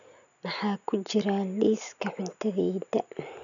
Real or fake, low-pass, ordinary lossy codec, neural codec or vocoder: real; 7.2 kHz; none; none